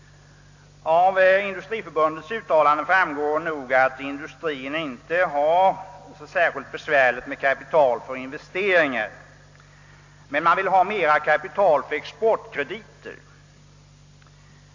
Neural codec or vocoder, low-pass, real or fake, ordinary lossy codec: none; 7.2 kHz; real; AAC, 48 kbps